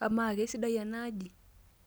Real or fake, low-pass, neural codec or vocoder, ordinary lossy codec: real; none; none; none